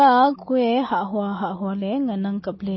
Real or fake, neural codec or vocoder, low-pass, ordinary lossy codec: real; none; 7.2 kHz; MP3, 24 kbps